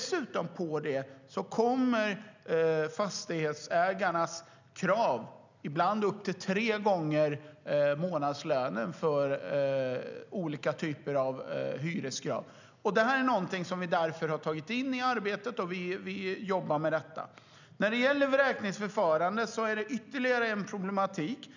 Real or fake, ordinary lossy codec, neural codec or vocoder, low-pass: real; none; none; 7.2 kHz